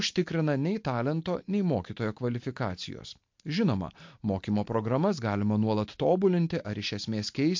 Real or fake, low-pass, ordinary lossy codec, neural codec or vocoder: real; 7.2 kHz; MP3, 48 kbps; none